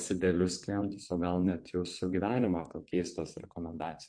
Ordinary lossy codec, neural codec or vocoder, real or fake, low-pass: MP3, 48 kbps; vocoder, 22.05 kHz, 80 mel bands, WaveNeXt; fake; 9.9 kHz